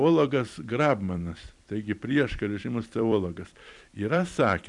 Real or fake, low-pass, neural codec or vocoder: fake; 10.8 kHz; vocoder, 44.1 kHz, 128 mel bands every 256 samples, BigVGAN v2